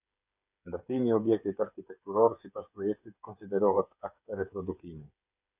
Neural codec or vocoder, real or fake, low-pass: codec, 16 kHz, 8 kbps, FreqCodec, smaller model; fake; 3.6 kHz